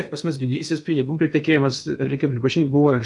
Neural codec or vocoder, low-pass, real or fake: codec, 16 kHz in and 24 kHz out, 0.8 kbps, FocalCodec, streaming, 65536 codes; 10.8 kHz; fake